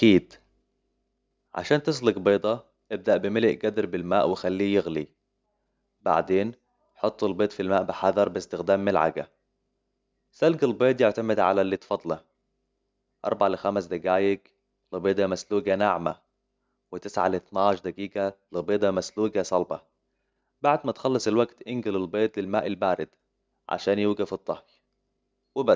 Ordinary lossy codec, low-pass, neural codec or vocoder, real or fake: none; none; none; real